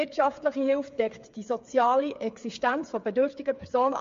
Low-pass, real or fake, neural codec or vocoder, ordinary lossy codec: 7.2 kHz; fake; codec, 16 kHz, 8 kbps, FreqCodec, smaller model; MP3, 48 kbps